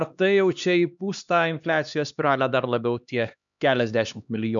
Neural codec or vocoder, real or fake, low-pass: codec, 16 kHz, 2 kbps, X-Codec, HuBERT features, trained on LibriSpeech; fake; 7.2 kHz